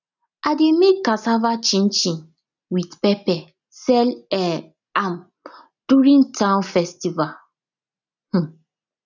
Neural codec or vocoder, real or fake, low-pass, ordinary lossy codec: none; real; 7.2 kHz; none